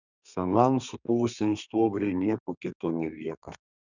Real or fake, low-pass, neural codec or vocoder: fake; 7.2 kHz; codec, 32 kHz, 1.9 kbps, SNAC